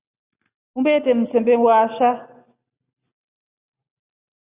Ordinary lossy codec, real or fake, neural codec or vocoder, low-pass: Opus, 64 kbps; real; none; 3.6 kHz